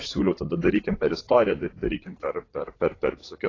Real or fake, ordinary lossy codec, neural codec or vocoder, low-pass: fake; AAC, 32 kbps; vocoder, 44.1 kHz, 80 mel bands, Vocos; 7.2 kHz